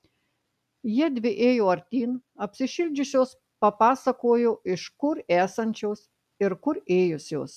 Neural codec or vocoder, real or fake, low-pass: none; real; 14.4 kHz